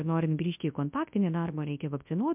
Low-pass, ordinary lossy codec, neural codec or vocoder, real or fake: 3.6 kHz; MP3, 32 kbps; codec, 24 kHz, 0.9 kbps, WavTokenizer, large speech release; fake